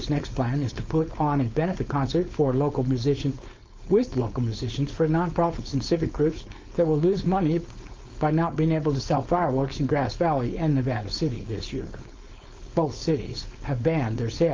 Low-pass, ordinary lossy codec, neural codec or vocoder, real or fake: 7.2 kHz; Opus, 32 kbps; codec, 16 kHz, 4.8 kbps, FACodec; fake